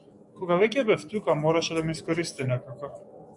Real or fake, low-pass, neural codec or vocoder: fake; 10.8 kHz; codec, 44.1 kHz, 7.8 kbps, DAC